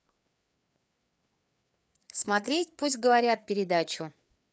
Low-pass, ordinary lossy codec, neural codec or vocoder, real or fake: none; none; codec, 16 kHz, 4 kbps, FreqCodec, larger model; fake